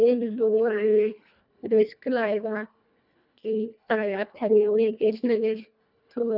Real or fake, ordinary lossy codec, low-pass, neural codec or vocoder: fake; none; 5.4 kHz; codec, 24 kHz, 1.5 kbps, HILCodec